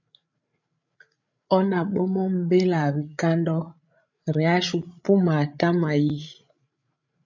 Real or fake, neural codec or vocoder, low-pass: fake; codec, 16 kHz, 8 kbps, FreqCodec, larger model; 7.2 kHz